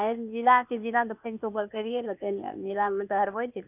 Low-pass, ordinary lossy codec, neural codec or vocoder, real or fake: 3.6 kHz; none; codec, 16 kHz, 0.8 kbps, ZipCodec; fake